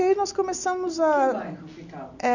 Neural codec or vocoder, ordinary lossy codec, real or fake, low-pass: none; none; real; 7.2 kHz